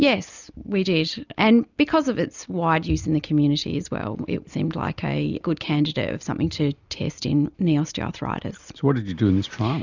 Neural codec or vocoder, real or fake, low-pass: none; real; 7.2 kHz